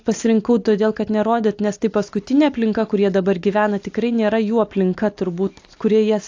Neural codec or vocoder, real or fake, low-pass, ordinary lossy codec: none; real; 7.2 kHz; AAC, 48 kbps